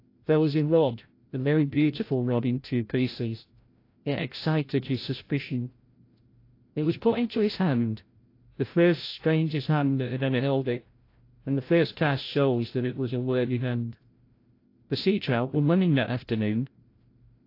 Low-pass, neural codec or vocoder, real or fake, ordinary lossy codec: 5.4 kHz; codec, 16 kHz, 0.5 kbps, FreqCodec, larger model; fake; AAC, 32 kbps